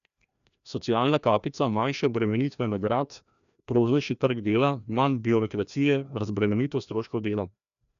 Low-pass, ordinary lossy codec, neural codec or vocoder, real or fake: 7.2 kHz; none; codec, 16 kHz, 1 kbps, FreqCodec, larger model; fake